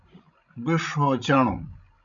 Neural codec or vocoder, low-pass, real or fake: codec, 16 kHz, 16 kbps, FreqCodec, larger model; 7.2 kHz; fake